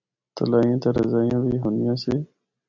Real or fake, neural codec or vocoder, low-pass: real; none; 7.2 kHz